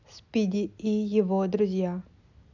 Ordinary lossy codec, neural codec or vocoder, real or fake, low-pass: none; none; real; 7.2 kHz